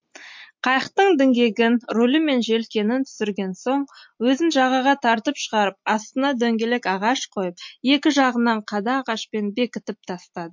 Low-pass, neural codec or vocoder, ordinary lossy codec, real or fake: 7.2 kHz; none; MP3, 48 kbps; real